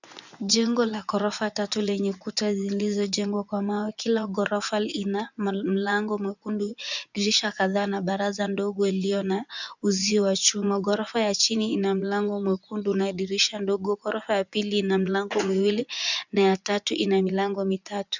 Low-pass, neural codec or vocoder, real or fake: 7.2 kHz; vocoder, 24 kHz, 100 mel bands, Vocos; fake